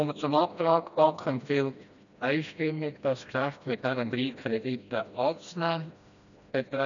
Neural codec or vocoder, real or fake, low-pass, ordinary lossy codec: codec, 16 kHz, 1 kbps, FreqCodec, smaller model; fake; 7.2 kHz; none